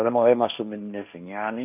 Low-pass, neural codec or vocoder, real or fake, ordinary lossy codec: 3.6 kHz; codec, 16 kHz, 1.1 kbps, Voila-Tokenizer; fake; none